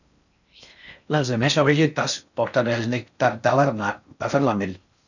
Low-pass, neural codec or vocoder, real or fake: 7.2 kHz; codec, 16 kHz in and 24 kHz out, 0.6 kbps, FocalCodec, streaming, 4096 codes; fake